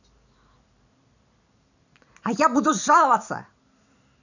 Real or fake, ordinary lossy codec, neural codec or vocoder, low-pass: fake; none; vocoder, 44.1 kHz, 128 mel bands every 256 samples, BigVGAN v2; 7.2 kHz